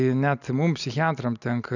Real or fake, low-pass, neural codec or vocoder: real; 7.2 kHz; none